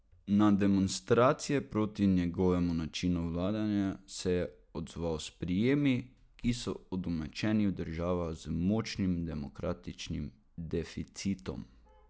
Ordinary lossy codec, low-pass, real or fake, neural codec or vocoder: none; none; real; none